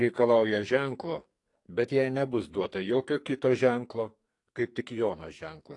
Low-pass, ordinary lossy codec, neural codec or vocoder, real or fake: 10.8 kHz; AAC, 48 kbps; codec, 44.1 kHz, 2.6 kbps, SNAC; fake